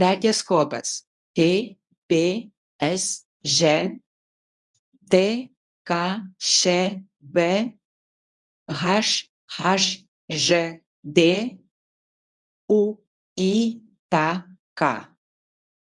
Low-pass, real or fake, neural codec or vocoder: 10.8 kHz; fake; codec, 24 kHz, 0.9 kbps, WavTokenizer, medium speech release version 1